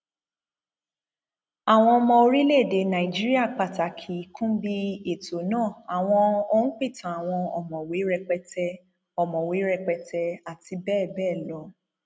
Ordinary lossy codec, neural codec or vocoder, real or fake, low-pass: none; none; real; none